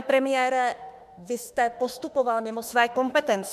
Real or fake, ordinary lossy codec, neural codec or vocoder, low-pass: fake; MP3, 96 kbps; autoencoder, 48 kHz, 32 numbers a frame, DAC-VAE, trained on Japanese speech; 14.4 kHz